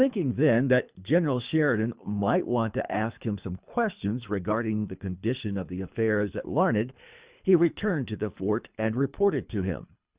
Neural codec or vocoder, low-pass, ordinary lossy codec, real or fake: codec, 24 kHz, 3 kbps, HILCodec; 3.6 kHz; Opus, 64 kbps; fake